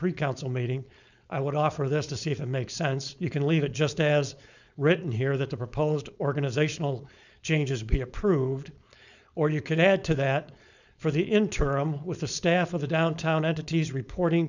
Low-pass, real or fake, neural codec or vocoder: 7.2 kHz; fake; codec, 16 kHz, 4.8 kbps, FACodec